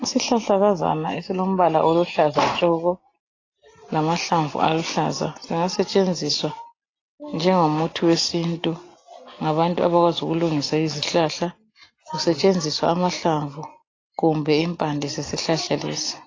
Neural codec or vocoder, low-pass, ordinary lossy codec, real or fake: none; 7.2 kHz; AAC, 32 kbps; real